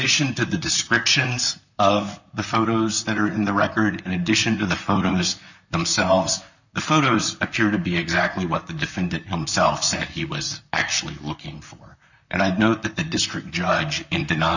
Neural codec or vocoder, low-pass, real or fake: vocoder, 44.1 kHz, 128 mel bands, Pupu-Vocoder; 7.2 kHz; fake